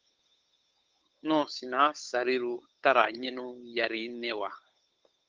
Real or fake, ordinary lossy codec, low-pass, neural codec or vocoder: fake; Opus, 32 kbps; 7.2 kHz; codec, 16 kHz, 8 kbps, FunCodec, trained on Chinese and English, 25 frames a second